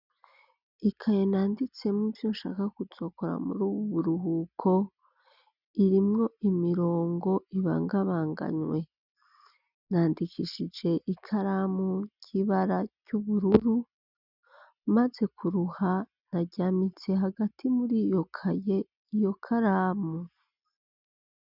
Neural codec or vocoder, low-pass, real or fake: none; 5.4 kHz; real